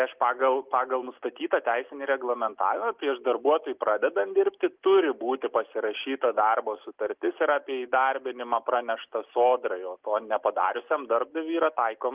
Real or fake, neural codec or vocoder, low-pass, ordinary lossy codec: real; none; 3.6 kHz; Opus, 32 kbps